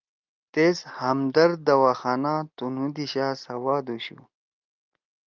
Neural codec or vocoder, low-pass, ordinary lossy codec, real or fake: none; 7.2 kHz; Opus, 24 kbps; real